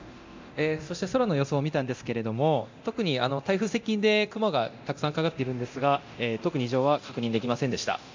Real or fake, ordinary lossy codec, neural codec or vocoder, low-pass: fake; MP3, 64 kbps; codec, 24 kHz, 0.9 kbps, DualCodec; 7.2 kHz